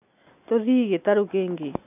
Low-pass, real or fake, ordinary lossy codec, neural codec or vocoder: 3.6 kHz; real; none; none